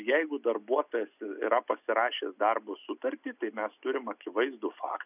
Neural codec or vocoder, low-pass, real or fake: none; 3.6 kHz; real